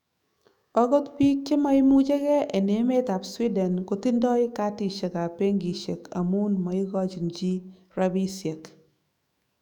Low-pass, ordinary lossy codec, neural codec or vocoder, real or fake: 19.8 kHz; none; autoencoder, 48 kHz, 128 numbers a frame, DAC-VAE, trained on Japanese speech; fake